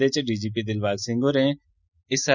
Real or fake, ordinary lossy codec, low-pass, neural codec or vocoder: real; Opus, 64 kbps; 7.2 kHz; none